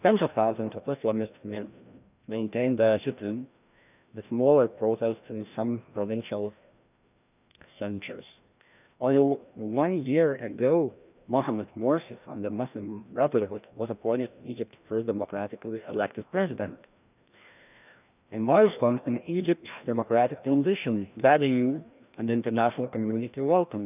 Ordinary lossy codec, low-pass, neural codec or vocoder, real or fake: none; 3.6 kHz; codec, 16 kHz, 1 kbps, FreqCodec, larger model; fake